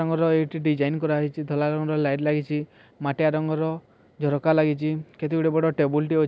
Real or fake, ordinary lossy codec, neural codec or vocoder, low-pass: real; none; none; none